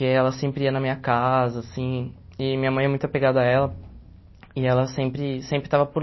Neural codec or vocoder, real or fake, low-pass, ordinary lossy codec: none; real; 7.2 kHz; MP3, 24 kbps